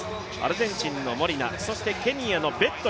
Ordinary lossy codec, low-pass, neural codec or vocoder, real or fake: none; none; none; real